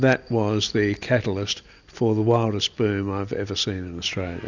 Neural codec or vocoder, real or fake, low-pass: none; real; 7.2 kHz